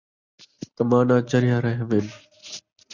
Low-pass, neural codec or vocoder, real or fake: 7.2 kHz; none; real